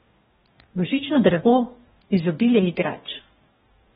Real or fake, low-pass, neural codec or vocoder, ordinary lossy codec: fake; 19.8 kHz; codec, 44.1 kHz, 2.6 kbps, DAC; AAC, 16 kbps